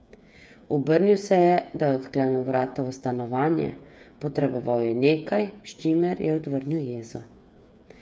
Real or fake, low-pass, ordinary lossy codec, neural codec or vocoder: fake; none; none; codec, 16 kHz, 8 kbps, FreqCodec, smaller model